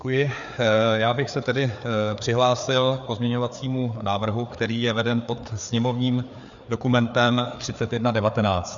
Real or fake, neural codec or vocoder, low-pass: fake; codec, 16 kHz, 4 kbps, FreqCodec, larger model; 7.2 kHz